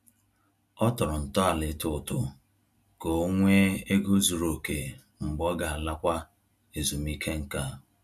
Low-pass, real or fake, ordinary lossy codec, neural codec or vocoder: 14.4 kHz; real; none; none